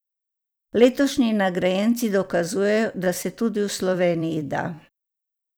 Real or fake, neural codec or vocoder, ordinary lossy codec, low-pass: fake; vocoder, 44.1 kHz, 128 mel bands every 256 samples, BigVGAN v2; none; none